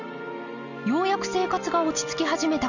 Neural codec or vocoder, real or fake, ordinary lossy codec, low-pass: none; real; none; 7.2 kHz